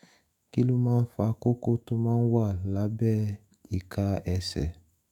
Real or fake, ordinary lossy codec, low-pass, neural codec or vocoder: fake; none; 19.8 kHz; autoencoder, 48 kHz, 128 numbers a frame, DAC-VAE, trained on Japanese speech